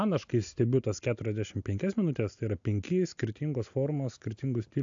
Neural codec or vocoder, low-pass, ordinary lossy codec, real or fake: none; 7.2 kHz; AAC, 64 kbps; real